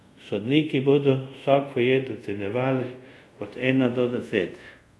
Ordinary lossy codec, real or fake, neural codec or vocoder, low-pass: none; fake; codec, 24 kHz, 0.5 kbps, DualCodec; none